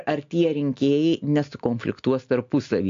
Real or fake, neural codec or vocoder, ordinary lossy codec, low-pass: real; none; AAC, 64 kbps; 7.2 kHz